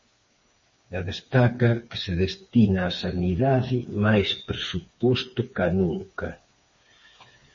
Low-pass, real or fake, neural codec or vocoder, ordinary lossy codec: 7.2 kHz; fake; codec, 16 kHz, 4 kbps, FreqCodec, smaller model; MP3, 32 kbps